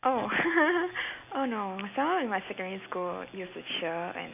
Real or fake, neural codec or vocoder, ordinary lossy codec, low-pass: real; none; none; 3.6 kHz